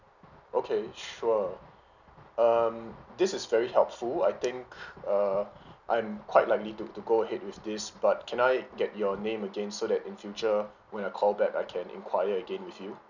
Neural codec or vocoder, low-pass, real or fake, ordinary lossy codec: none; 7.2 kHz; real; none